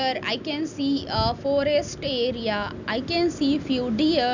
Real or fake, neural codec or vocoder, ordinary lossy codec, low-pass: real; none; none; 7.2 kHz